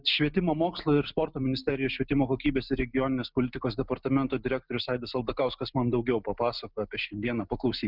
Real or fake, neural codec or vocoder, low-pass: real; none; 5.4 kHz